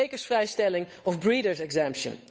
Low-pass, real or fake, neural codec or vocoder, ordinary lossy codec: none; fake; codec, 16 kHz, 8 kbps, FunCodec, trained on Chinese and English, 25 frames a second; none